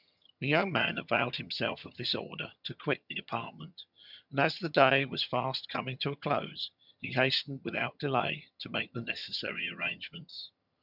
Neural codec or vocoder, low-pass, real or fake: vocoder, 22.05 kHz, 80 mel bands, HiFi-GAN; 5.4 kHz; fake